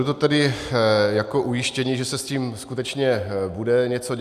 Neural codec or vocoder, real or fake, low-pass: none; real; 14.4 kHz